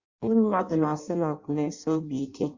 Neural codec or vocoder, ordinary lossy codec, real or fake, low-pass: codec, 16 kHz in and 24 kHz out, 0.6 kbps, FireRedTTS-2 codec; Opus, 64 kbps; fake; 7.2 kHz